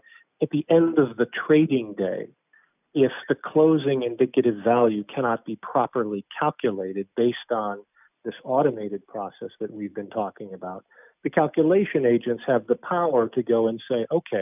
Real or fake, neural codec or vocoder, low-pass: real; none; 3.6 kHz